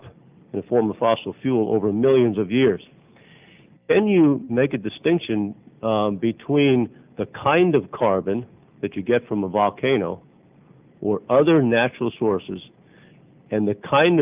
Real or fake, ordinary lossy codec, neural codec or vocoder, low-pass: real; Opus, 24 kbps; none; 3.6 kHz